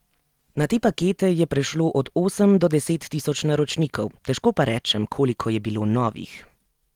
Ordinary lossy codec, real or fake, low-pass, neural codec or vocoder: Opus, 24 kbps; real; 19.8 kHz; none